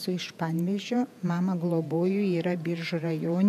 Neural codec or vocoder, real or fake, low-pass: vocoder, 48 kHz, 128 mel bands, Vocos; fake; 14.4 kHz